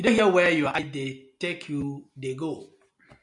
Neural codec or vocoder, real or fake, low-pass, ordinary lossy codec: none; real; 10.8 kHz; MP3, 96 kbps